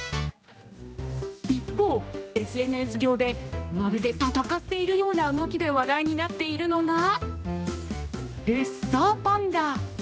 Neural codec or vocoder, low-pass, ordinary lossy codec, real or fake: codec, 16 kHz, 1 kbps, X-Codec, HuBERT features, trained on general audio; none; none; fake